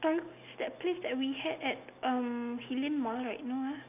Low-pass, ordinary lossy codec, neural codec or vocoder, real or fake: 3.6 kHz; none; none; real